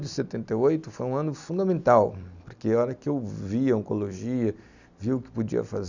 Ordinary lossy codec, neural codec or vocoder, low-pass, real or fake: none; none; 7.2 kHz; real